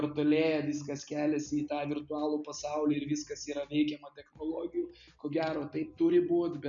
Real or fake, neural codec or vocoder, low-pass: real; none; 7.2 kHz